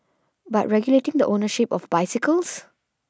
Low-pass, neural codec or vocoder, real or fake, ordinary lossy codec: none; none; real; none